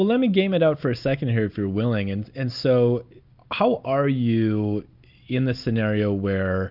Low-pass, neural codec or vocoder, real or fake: 5.4 kHz; none; real